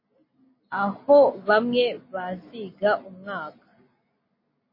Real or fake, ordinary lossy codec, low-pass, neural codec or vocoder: real; MP3, 24 kbps; 5.4 kHz; none